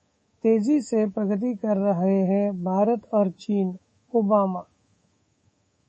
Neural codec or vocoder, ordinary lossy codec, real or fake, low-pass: codec, 24 kHz, 3.1 kbps, DualCodec; MP3, 32 kbps; fake; 10.8 kHz